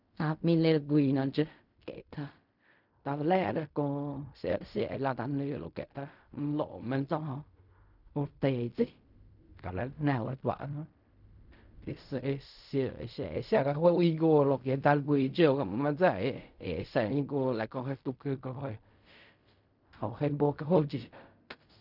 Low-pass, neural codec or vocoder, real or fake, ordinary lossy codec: 5.4 kHz; codec, 16 kHz in and 24 kHz out, 0.4 kbps, LongCat-Audio-Codec, fine tuned four codebook decoder; fake; none